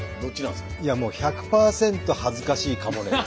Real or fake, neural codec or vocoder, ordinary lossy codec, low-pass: real; none; none; none